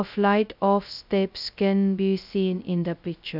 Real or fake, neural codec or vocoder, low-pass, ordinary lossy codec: fake; codec, 16 kHz, 0.2 kbps, FocalCodec; 5.4 kHz; none